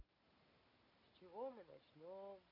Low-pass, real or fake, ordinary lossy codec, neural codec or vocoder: 5.4 kHz; real; none; none